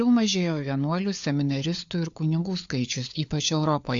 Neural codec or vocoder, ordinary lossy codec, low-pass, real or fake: codec, 16 kHz, 4 kbps, FunCodec, trained on LibriTTS, 50 frames a second; AAC, 64 kbps; 7.2 kHz; fake